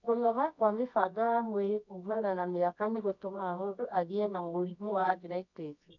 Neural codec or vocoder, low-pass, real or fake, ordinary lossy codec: codec, 24 kHz, 0.9 kbps, WavTokenizer, medium music audio release; 7.2 kHz; fake; none